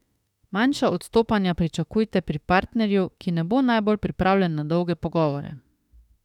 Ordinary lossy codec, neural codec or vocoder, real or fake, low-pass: none; autoencoder, 48 kHz, 32 numbers a frame, DAC-VAE, trained on Japanese speech; fake; 19.8 kHz